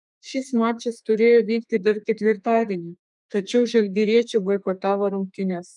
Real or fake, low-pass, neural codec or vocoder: fake; 10.8 kHz; codec, 32 kHz, 1.9 kbps, SNAC